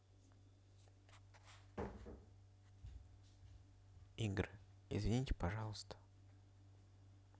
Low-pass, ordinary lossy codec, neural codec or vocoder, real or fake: none; none; none; real